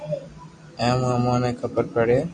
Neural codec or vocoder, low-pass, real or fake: none; 9.9 kHz; real